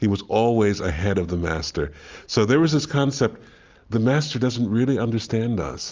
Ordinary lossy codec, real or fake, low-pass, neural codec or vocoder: Opus, 32 kbps; real; 7.2 kHz; none